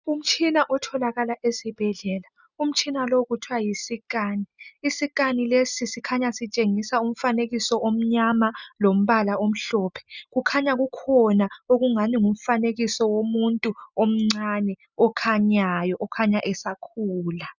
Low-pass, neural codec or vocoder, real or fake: 7.2 kHz; none; real